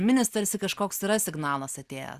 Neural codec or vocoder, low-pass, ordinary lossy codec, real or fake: none; 14.4 kHz; Opus, 64 kbps; real